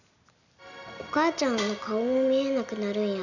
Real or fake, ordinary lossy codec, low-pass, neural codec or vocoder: real; none; 7.2 kHz; none